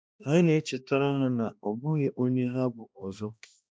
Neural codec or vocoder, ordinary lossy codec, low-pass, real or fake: codec, 16 kHz, 1 kbps, X-Codec, HuBERT features, trained on balanced general audio; none; none; fake